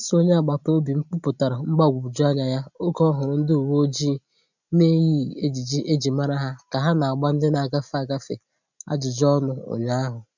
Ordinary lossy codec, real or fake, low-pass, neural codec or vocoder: none; real; 7.2 kHz; none